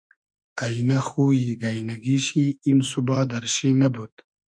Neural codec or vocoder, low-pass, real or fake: autoencoder, 48 kHz, 32 numbers a frame, DAC-VAE, trained on Japanese speech; 9.9 kHz; fake